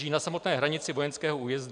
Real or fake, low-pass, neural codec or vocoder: real; 9.9 kHz; none